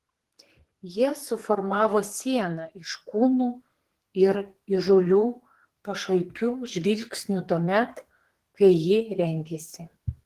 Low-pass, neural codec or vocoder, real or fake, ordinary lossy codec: 14.4 kHz; codec, 32 kHz, 1.9 kbps, SNAC; fake; Opus, 16 kbps